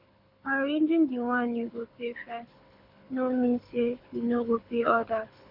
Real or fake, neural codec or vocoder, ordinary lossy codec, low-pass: fake; codec, 24 kHz, 6 kbps, HILCodec; MP3, 48 kbps; 5.4 kHz